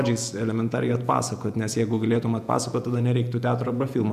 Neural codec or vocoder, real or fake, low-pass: vocoder, 48 kHz, 128 mel bands, Vocos; fake; 14.4 kHz